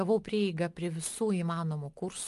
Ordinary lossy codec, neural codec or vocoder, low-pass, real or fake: Opus, 32 kbps; none; 10.8 kHz; real